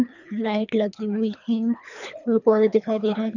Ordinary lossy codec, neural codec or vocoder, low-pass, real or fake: none; codec, 24 kHz, 3 kbps, HILCodec; 7.2 kHz; fake